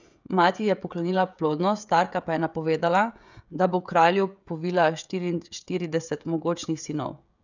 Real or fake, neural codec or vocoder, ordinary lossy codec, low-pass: fake; codec, 16 kHz, 16 kbps, FreqCodec, smaller model; none; 7.2 kHz